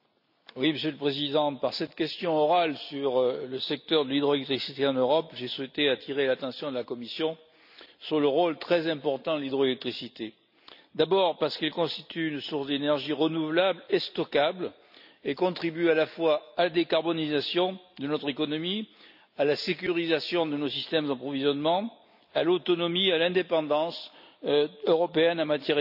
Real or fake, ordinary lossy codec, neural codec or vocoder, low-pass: real; none; none; 5.4 kHz